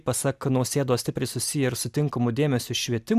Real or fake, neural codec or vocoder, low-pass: real; none; 14.4 kHz